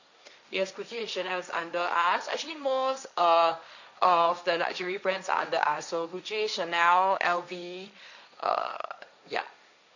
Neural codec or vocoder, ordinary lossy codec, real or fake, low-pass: codec, 16 kHz, 1.1 kbps, Voila-Tokenizer; none; fake; 7.2 kHz